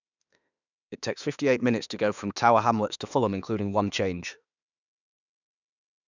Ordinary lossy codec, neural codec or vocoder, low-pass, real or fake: none; autoencoder, 48 kHz, 32 numbers a frame, DAC-VAE, trained on Japanese speech; 7.2 kHz; fake